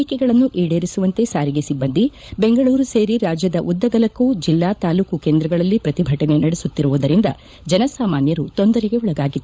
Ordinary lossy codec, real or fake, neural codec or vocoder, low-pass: none; fake; codec, 16 kHz, 16 kbps, FunCodec, trained on LibriTTS, 50 frames a second; none